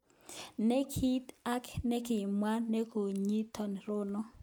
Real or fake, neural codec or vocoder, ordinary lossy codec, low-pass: real; none; none; none